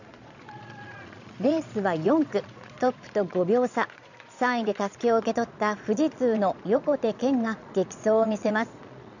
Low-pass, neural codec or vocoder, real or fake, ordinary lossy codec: 7.2 kHz; vocoder, 22.05 kHz, 80 mel bands, Vocos; fake; none